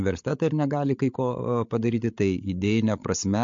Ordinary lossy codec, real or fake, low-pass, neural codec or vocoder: MP3, 48 kbps; fake; 7.2 kHz; codec, 16 kHz, 16 kbps, FreqCodec, larger model